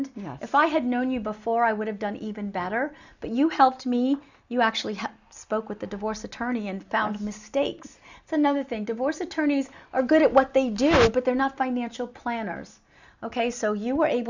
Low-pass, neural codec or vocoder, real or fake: 7.2 kHz; none; real